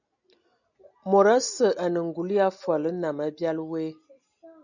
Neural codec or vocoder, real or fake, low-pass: none; real; 7.2 kHz